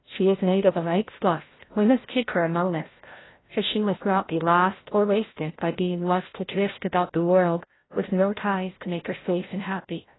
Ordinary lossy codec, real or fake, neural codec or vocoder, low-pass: AAC, 16 kbps; fake; codec, 16 kHz, 0.5 kbps, FreqCodec, larger model; 7.2 kHz